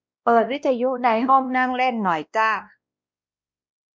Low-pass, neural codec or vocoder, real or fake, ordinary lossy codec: none; codec, 16 kHz, 1 kbps, X-Codec, WavLM features, trained on Multilingual LibriSpeech; fake; none